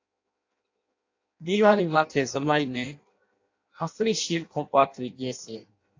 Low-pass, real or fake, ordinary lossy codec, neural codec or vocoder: 7.2 kHz; fake; AAC, 48 kbps; codec, 16 kHz in and 24 kHz out, 0.6 kbps, FireRedTTS-2 codec